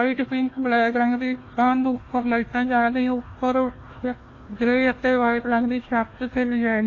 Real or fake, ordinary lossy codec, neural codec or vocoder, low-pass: fake; AAC, 32 kbps; codec, 16 kHz, 1 kbps, FunCodec, trained on Chinese and English, 50 frames a second; 7.2 kHz